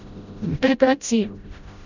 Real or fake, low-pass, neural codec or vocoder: fake; 7.2 kHz; codec, 16 kHz, 0.5 kbps, FreqCodec, smaller model